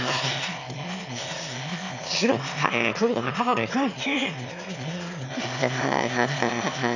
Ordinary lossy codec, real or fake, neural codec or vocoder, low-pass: none; fake; autoencoder, 22.05 kHz, a latent of 192 numbers a frame, VITS, trained on one speaker; 7.2 kHz